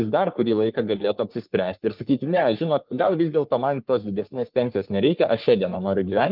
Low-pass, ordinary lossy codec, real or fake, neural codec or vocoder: 5.4 kHz; Opus, 32 kbps; fake; codec, 44.1 kHz, 3.4 kbps, Pupu-Codec